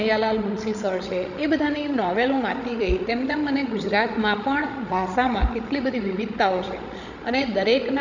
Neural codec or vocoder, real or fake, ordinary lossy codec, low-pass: codec, 16 kHz, 16 kbps, FreqCodec, larger model; fake; none; 7.2 kHz